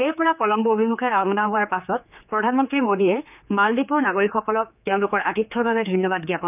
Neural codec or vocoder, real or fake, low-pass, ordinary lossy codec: codec, 16 kHz, 4 kbps, X-Codec, HuBERT features, trained on general audio; fake; 3.6 kHz; none